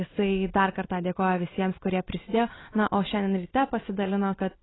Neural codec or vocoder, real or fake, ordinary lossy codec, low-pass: none; real; AAC, 16 kbps; 7.2 kHz